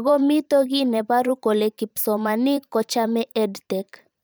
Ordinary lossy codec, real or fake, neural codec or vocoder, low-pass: none; fake; vocoder, 44.1 kHz, 128 mel bands every 512 samples, BigVGAN v2; none